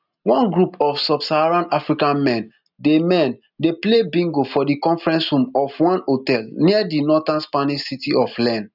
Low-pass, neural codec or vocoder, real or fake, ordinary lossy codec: 5.4 kHz; none; real; none